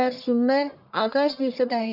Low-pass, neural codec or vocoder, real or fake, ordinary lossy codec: 5.4 kHz; codec, 44.1 kHz, 1.7 kbps, Pupu-Codec; fake; none